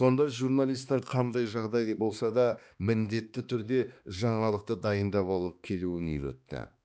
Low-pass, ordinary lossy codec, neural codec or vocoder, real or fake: none; none; codec, 16 kHz, 2 kbps, X-Codec, HuBERT features, trained on balanced general audio; fake